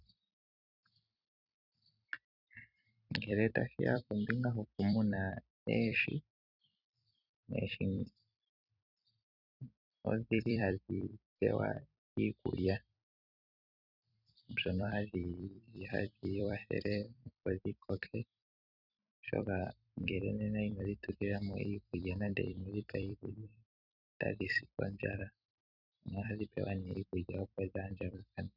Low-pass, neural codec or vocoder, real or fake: 5.4 kHz; vocoder, 44.1 kHz, 128 mel bands every 256 samples, BigVGAN v2; fake